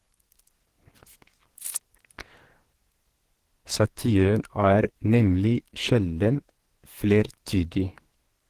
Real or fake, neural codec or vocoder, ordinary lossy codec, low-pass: fake; codec, 44.1 kHz, 2.6 kbps, SNAC; Opus, 16 kbps; 14.4 kHz